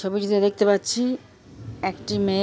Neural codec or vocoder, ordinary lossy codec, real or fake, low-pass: none; none; real; none